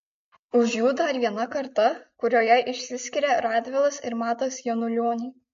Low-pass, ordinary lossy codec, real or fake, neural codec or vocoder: 7.2 kHz; MP3, 48 kbps; real; none